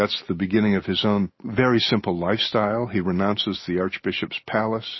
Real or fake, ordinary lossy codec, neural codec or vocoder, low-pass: real; MP3, 24 kbps; none; 7.2 kHz